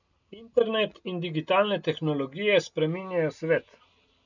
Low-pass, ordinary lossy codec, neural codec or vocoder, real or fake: 7.2 kHz; none; none; real